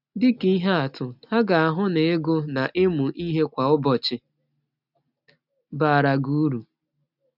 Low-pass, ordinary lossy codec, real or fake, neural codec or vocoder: 5.4 kHz; none; real; none